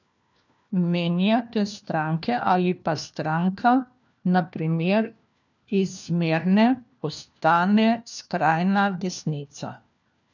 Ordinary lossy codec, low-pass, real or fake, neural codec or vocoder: none; 7.2 kHz; fake; codec, 16 kHz, 1 kbps, FunCodec, trained on LibriTTS, 50 frames a second